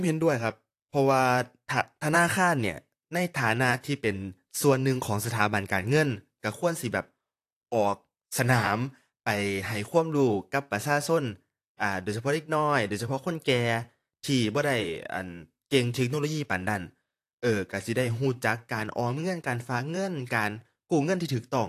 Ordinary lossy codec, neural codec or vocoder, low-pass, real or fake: AAC, 64 kbps; vocoder, 44.1 kHz, 128 mel bands, Pupu-Vocoder; 14.4 kHz; fake